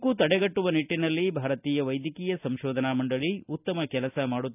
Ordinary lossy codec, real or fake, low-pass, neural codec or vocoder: none; real; 3.6 kHz; none